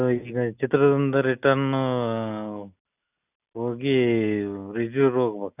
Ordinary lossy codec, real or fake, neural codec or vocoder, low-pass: none; real; none; 3.6 kHz